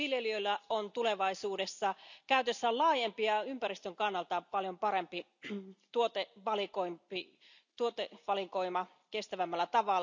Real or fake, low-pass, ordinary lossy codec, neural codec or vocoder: real; 7.2 kHz; none; none